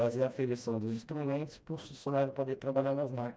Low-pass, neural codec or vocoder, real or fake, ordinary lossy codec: none; codec, 16 kHz, 1 kbps, FreqCodec, smaller model; fake; none